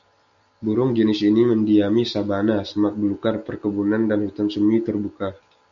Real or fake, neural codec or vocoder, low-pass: real; none; 7.2 kHz